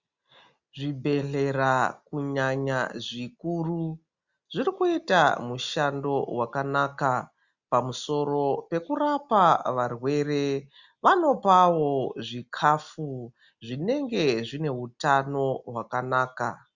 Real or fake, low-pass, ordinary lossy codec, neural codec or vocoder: real; 7.2 kHz; Opus, 64 kbps; none